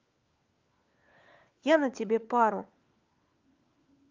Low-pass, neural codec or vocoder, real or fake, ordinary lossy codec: 7.2 kHz; codec, 16 kHz, 4 kbps, FunCodec, trained on LibriTTS, 50 frames a second; fake; Opus, 24 kbps